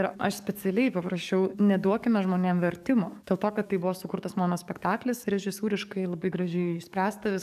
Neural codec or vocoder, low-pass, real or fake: codec, 44.1 kHz, 7.8 kbps, DAC; 14.4 kHz; fake